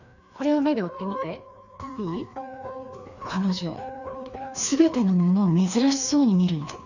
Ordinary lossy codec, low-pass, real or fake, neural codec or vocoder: none; 7.2 kHz; fake; codec, 16 kHz, 2 kbps, FreqCodec, larger model